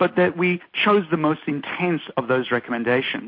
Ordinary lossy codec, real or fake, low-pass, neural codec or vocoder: MP3, 32 kbps; real; 5.4 kHz; none